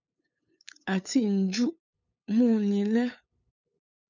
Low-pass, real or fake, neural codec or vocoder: 7.2 kHz; fake; codec, 16 kHz, 8 kbps, FunCodec, trained on LibriTTS, 25 frames a second